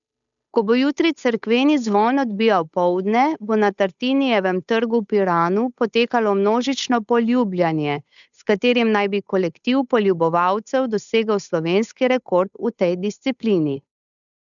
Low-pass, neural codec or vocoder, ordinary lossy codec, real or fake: 7.2 kHz; codec, 16 kHz, 8 kbps, FunCodec, trained on Chinese and English, 25 frames a second; none; fake